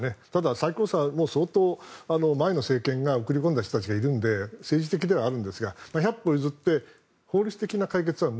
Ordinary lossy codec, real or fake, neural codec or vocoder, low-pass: none; real; none; none